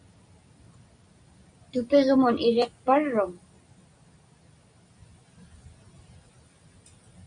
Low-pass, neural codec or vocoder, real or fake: 9.9 kHz; none; real